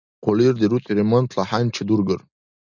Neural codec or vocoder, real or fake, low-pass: none; real; 7.2 kHz